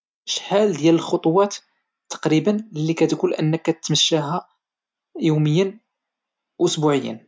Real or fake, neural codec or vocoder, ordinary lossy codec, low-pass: real; none; none; none